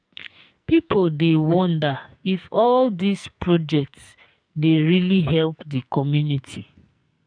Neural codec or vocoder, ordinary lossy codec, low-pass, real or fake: codec, 44.1 kHz, 2.6 kbps, SNAC; none; 9.9 kHz; fake